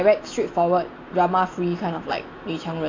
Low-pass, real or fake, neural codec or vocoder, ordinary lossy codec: 7.2 kHz; real; none; AAC, 32 kbps